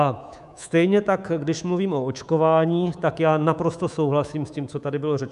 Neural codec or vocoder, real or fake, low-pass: codec, 24 kHz, 3.1 kbps, DualCodec; fake; 10.8 kHz